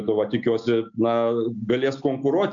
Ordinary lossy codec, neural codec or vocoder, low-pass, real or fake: MP3, 64 kbps; none; 7.2 kHz; real